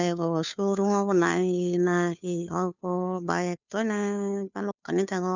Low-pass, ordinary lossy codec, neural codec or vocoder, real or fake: 7.2 kHz; none; codec, 16 kHz, 2 kbps, FunCodec, trained on Chinese and English, 25 frames a second; fake